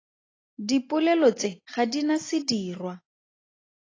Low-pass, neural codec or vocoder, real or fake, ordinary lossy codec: 7.2 kHz; none; real; AAC, 32 kbps